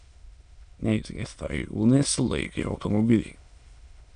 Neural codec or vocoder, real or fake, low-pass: autoencoder, 22.05 kHz, a latent of 192 numbers a frame, VITS, trained on many speakers; fake; 9.9 kHz